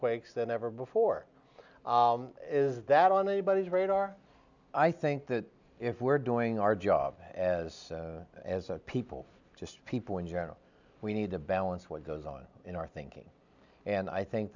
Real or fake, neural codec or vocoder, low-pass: real; none; 7.2 kHz